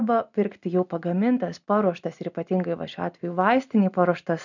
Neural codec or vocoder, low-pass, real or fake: none; 7.2 kHz; real